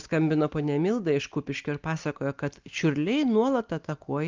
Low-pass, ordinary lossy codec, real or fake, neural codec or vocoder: 7.2 kHz; Opus, 32 kbps; real; none